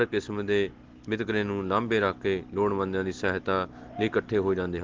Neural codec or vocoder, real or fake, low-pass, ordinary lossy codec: none; real; 7.2 kHz; Opus, 16 kbps